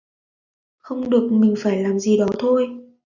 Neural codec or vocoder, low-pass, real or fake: none; 7.2 kHz; real